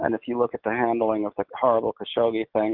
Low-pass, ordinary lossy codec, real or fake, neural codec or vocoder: 5.4 kHz; Opus, 16 kbps; real; none